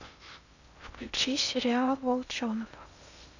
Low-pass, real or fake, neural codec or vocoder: 7.2 kHz; fake; codec, 16 kHz in and 24 kHz out, 0.6 kbps, FocalCodec, streaming, 2048 codes